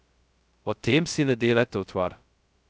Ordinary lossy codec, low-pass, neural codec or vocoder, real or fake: none; none; codec, 16 kHz, 0.2 kbps, FocalCodec; fake